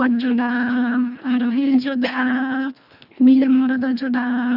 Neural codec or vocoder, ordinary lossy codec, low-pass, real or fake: codec, 24 kHz, 1.5 kbps, HILCodec; none; 5.4 kHz; fake